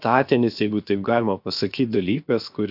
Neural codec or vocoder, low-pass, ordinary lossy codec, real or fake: codec, 16 kHz, 0.7 kbps, FocalCodec; 5.4 kHz; AAC, 48 kbps; fake